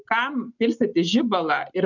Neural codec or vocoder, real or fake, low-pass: none; real; 7.2 kHz